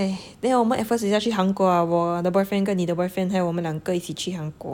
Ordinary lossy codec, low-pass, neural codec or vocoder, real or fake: none; 19.8 kHz; none; real